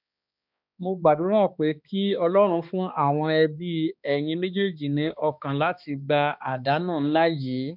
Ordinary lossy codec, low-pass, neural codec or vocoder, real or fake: none; 5.4 kHz; codec, 16 kHz, 2 kbps, X-Codec, HuBERT features, trained on balanced general audio; fake